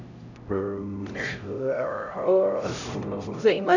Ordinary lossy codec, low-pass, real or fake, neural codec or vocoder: none; 7.2 kHz; fake; codec, 16 kHz, 0.5 kbps, X-Codec, HuBERT features, trained on LibriSpeech